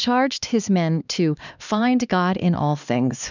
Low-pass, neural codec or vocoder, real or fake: 7.2 kHz; codec, 16 kHz, 2 kbps, X-Codec, HuBERT features, trained on LibriSpeech; fake